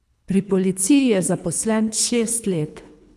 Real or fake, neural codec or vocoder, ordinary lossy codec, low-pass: fake; codec, 24 kHz, 3 kbps, HILCodec; none; none